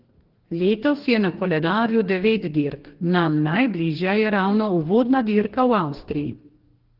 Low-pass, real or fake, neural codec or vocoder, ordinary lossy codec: 5.4 kHz; fake; codec, 44.1 kHz, 2.6 kbps, DAC; Opus, 16 kbps